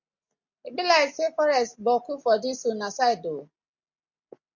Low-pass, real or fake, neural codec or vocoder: 7.2 kHz; real; none